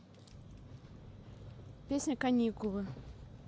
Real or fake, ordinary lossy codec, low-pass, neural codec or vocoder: real; none; none; none